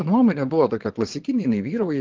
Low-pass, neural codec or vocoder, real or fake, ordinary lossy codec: 7.2 kHz; vocoder, 22.05 kHz, 80 mel bands, Vocos; fake; Opus, 16 kbps